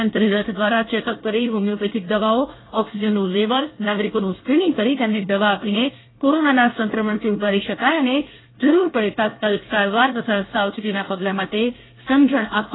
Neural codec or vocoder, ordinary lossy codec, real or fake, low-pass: codec, 24 kHz, 1 kbps, SNAC; AAC, 16 kbps; fake; 7.2 kHz